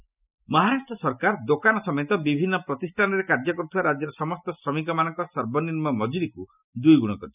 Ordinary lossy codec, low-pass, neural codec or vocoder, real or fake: none; 3.6 kHz; none; real